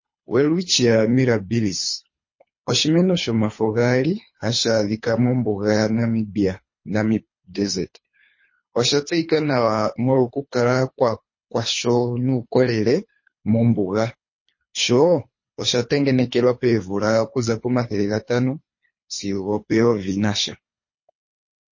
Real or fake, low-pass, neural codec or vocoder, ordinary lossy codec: fake; 7.2 kHz; codec, 24 kHz, 3 kbps, HILCodec; MP3, 32 kbps